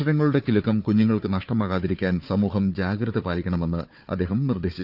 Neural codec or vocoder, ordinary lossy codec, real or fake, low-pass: codec, 16 kHz, 4 kbps, FunCodec, trained on Chinese and English, 50 frames a second; none; fake; 5.4 kHz